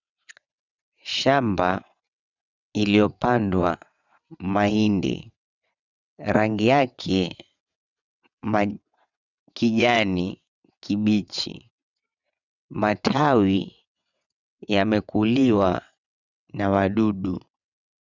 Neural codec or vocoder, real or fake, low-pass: vocoder, 22.05 kHz, 80 mel bands, WaveNeXt; fake; 7.2 kHz